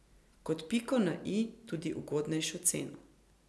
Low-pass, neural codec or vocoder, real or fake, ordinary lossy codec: none; none; real; none